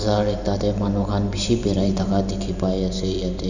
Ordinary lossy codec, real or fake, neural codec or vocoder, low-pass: none; fake; vocoder, 44.1 kHz, 128 mel bands every 512 samples, BigVGAN v2; 7.2 kHz